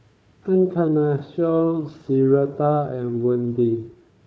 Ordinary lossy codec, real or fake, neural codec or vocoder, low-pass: none; fake; codec, 16 kHz, 4 kbps, FunCodec, trained on Chinese and English, 50 frames a second; none